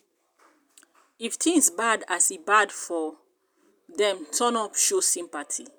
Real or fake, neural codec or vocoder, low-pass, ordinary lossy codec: real; none; none; none